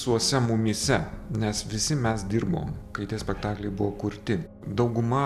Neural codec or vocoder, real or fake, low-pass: none; real; 14.4 kHz